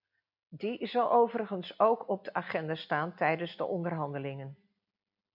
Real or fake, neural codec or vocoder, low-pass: real; none; 5.4 kHz